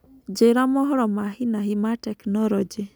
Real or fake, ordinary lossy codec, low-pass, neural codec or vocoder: real; none; none; none